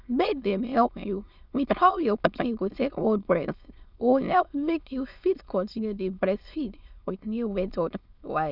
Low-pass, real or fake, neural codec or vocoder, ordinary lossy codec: 5.4 kHz; fake; autoencoder, 22.05 kHz, a latent of 192 numbers a frame, VITS, trained on many speakers; none